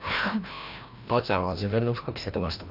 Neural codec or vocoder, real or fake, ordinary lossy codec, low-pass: codec, 16 kHz, 1 kbps, FreqCodec, larger model; fake; none; 5.4 kHz